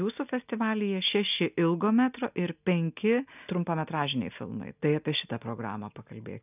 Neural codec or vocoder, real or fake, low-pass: none; real; 3.6 kHz